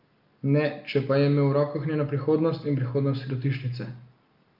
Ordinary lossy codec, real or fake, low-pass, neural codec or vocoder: Opus, 24 kbps; real; 5.4 kHz; none